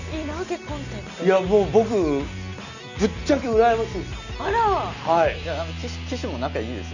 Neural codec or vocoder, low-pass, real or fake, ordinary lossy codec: none; 7.2 kHz; real; none